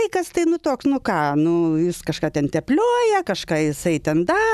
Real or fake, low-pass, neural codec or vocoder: real; 14.4 kHz; none